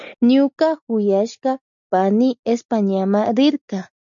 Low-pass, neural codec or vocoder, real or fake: 7.2 kHz; none; real